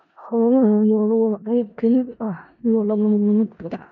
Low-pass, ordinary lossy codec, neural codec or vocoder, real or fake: 7.2 kHz; none; codec, 16 kHz in and 24 kHz out, 0.4 kbps, LongCat-Audio-Codec, four codebook decoder; fake